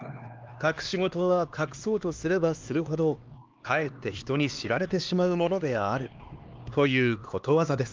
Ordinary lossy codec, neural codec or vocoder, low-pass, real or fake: Opus, 24 kbps; codec, 16 kHz, 2 kbps, X-Codec, HuBERT features, trained on LibriSpeech; 7.2 kHz; fake